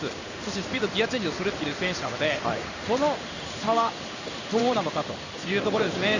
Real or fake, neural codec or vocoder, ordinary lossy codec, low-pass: fake; codec, 16 kHz in and 24 kHz out, 1 kbps, XY-Tokenizer; Opus, 64 kbps; 7.2 kHz